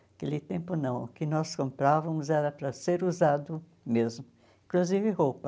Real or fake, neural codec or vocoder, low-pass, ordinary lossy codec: real; none; none; none